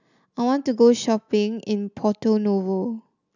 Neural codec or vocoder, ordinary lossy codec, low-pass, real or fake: none; none; 7.2 kHz; real